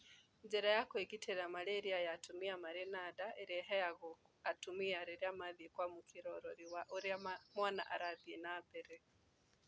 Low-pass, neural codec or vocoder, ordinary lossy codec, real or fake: none; none; none; real